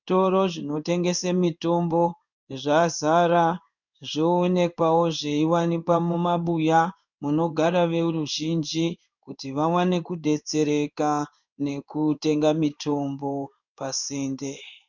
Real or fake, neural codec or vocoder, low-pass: fake; codec, 16 kHz in and 24 kHz out, 1 kbps, XY-Tokenizer; 7.2 kHz